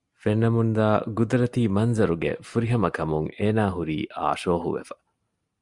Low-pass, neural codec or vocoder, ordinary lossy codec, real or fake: 10.8 kHz; none; Opus, 64 kbps; real